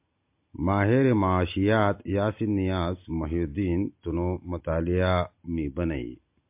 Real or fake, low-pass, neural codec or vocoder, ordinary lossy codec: real; 3.6 kHz; none; MP3, 32 kbps